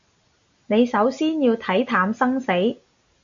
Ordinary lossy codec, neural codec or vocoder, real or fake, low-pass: AAC, 64 kbps; none; real; 7.2 kHz